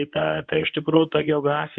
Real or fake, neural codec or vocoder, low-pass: fake; codec, 24 kHz, 0.9 kbps, WavTokenizer, medium speech release version 1; 9.9 kHz